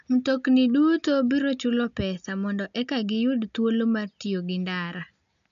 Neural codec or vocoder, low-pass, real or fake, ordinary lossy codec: none; 7.2 kHz; real; none